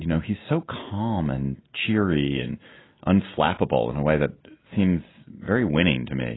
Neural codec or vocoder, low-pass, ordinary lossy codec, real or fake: none; 7.2 kHz; AAC, 16 kbps; real